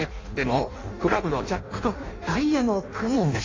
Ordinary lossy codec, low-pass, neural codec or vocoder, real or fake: AAC, 32 kbps; 7.2 kHz; codec, 16 kHz in and 24 kHz out, 0.6 kbps, FireRedTTS-2 codec; fake